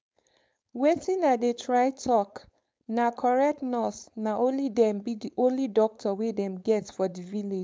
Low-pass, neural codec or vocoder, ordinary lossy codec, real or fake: none; codec, 16 kHz, 4.8 kbps, FACodec; none; fake